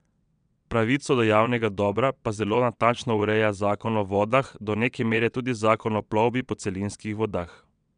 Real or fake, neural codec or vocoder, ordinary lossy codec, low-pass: fake; vocoder, 22.05 kHz, 80 mel bands, WaveNeXt; none; 9.9 kHz